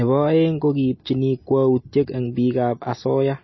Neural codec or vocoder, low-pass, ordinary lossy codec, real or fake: none; 7.2 kHz; MP3, 24 kbps; real